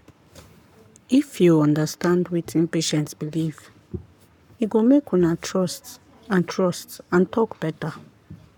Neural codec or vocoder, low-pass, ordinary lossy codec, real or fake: codec, 44.1 kHz, 7.8 kbps, Pupu-Codec; 19.8 kHz; none; fake